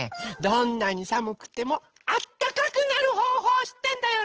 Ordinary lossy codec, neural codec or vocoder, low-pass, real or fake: Opus, 16 kbps; codec, 16 kHz, 8 kbps, FunCodec, trained on Chinese and English, 25 frames a second; 7.2 kHz; fake